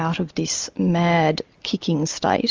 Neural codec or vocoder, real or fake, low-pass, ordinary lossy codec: none; real; 7.2 kHz; Opus, 32 kbps